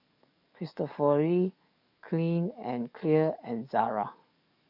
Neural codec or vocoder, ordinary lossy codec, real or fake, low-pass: codec, 44.1 kHz, 7.8 kbps, DAC; none; fake; 5.4 kHz